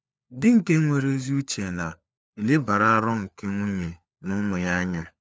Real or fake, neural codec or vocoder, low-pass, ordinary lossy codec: fake; codec, 16 kHz, 4 kbps, FunCodec, trained on LibriTTS, 50 frames a second; none; none